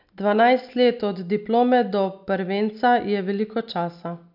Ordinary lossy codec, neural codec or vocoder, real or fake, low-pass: none; none; real; 5.4 kHz